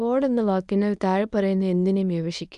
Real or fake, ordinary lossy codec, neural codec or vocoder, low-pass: fake; none; codec, 24 kHz, 0.9 kbps, WavTokenizer, medium speech release version 1; 10.8 kHz